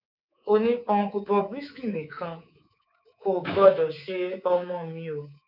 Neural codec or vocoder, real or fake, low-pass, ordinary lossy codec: codec, 24 kHz, 3.1 kbps, DualCodec; fake; 5.4 kHz; none